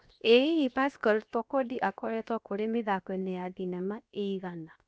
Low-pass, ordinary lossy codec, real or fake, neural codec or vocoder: none; none; fake; codec, 16 kHz, 0.7 kbps, FocalCodec